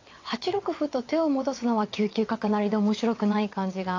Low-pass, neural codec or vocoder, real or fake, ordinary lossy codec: 7.2 kHz; vocoder, 22.05 kHz, 80 mel bands, WaveNeXt; fake; AAC, 32 kbps